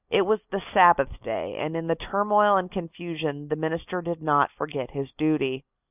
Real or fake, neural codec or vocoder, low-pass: real; none; 3.6 kHz